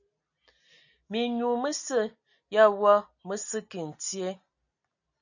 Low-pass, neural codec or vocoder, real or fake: 7.2 kHz; none; real